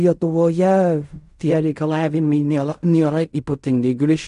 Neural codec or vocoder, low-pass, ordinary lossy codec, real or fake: codec, 16 kHz in and 24 kHz out, 0.4 kbps, LongCat-Audio-Codec, fine tuned four codebook decoder; 10.8 kHz; AAC, 96 kbps; fake